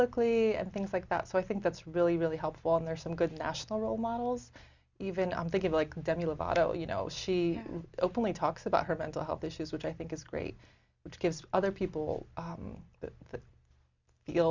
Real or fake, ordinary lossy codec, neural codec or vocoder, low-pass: real; Opus, 64 kbps; none; 7.2 kHz